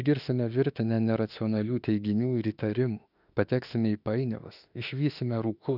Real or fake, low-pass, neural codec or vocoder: fake; 5.4 kHz; autoencoder, 48 kHz, 32 numbers a frame, DAC-VAE, trained on Japanese speech